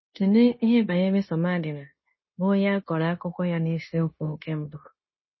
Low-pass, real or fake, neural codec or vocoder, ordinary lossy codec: 7.2 kHz; fake; codec, 16 kHz, 0.9 kbps, LongCat-Audio-Codec; MP3, 24 kbps